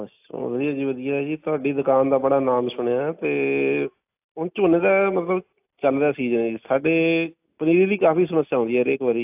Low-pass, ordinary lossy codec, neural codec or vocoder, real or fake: 3.6 kHz; AAC, 32 kbps; none; real